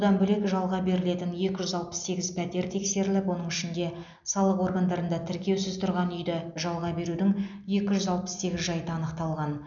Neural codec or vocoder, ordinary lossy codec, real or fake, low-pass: none; none; real; 7.2 kHz